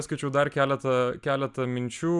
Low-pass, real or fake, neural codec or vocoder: 10.8 kHz; real; none